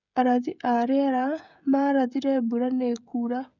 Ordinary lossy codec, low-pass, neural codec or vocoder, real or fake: none; 7.2 kHz; codec, 16 kHz, 16 kbps, FreqCodec, smaller model; fake